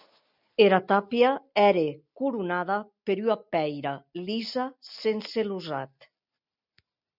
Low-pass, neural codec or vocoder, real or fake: 5.4 kHz; none; real